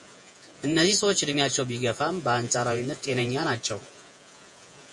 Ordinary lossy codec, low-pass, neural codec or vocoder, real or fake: MP3, 48 kbps; 10.8 kHz; vocoder, 48 kHz, 128 mel bands, Vocos; fake